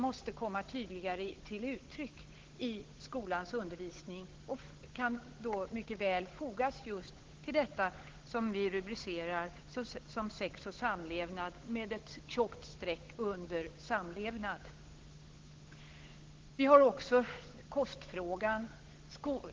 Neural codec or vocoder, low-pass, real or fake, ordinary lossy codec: codec, 16 kHz, 8 kbps, FunCodec, trained on Chinese and English, 25 frames a second; 7.2 kHz; fake; Opus, 16 kbps